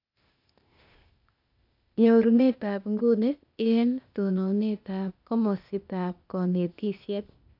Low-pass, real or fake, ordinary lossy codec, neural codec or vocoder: 5.4 kHz; fake; none; codec, 16 kHz, 0.8 kbps, ZipCodec